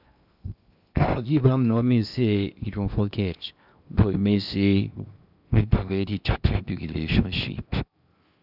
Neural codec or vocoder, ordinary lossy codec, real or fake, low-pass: codec, 16 kHz in and 24 kHz out, 0.8 kbps, FocalCodec, streaming, 65536 codes; none; fake; 5.4 kHz